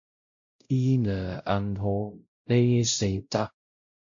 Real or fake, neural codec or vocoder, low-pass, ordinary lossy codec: fake; codec, 16 kHz, 0.5 kbps, X-Codec, WavLM features, trained on Multilingual LibriSpeech; 7.2 kHz; MP3, 48 kbps